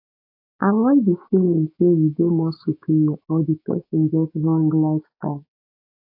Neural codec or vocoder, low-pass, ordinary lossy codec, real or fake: codec, 16 kHz, 6 kbps, DAC; 5.4 kHz; AAC, 32 kbps; fake